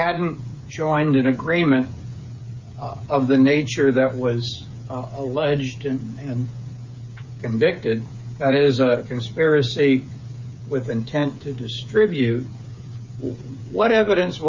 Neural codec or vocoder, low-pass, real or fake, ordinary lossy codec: codec, 16 kHz, 8 kbps, FreqCodec, smaller model; 7.2 kHz; fake; MP3, 48 kbps